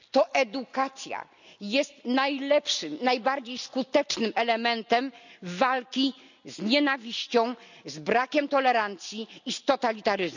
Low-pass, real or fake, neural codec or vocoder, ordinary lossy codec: 7.2 kHz; real; none; none